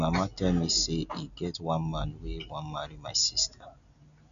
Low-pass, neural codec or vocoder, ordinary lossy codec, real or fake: 7.2 kHz; none; none; real